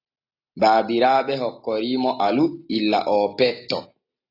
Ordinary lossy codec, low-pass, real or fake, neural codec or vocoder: AAC, 32 kbps; 5.4 kHz; real; none